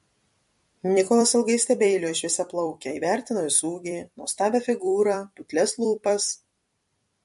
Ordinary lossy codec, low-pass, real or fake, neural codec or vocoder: MP3, 48 kbps; 14.4 kHz; fake; vocoder, 44.1 kHz, 128 mel bands every 256 samples, BigVGAN v2